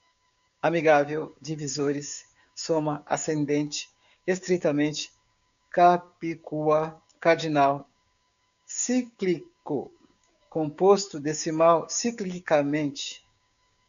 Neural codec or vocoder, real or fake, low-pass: codec, 16 kHz, 6 kbps, DAC; fake; 7.2 kHz